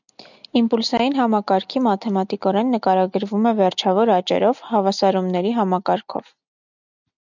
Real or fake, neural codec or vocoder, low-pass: real; none; 7.2 kHz